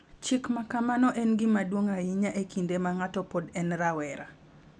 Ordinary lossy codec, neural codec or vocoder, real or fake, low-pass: none; none; real; none